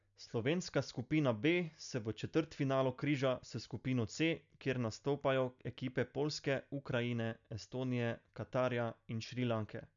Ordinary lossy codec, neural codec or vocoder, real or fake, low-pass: none; none; real; 7.2 kHz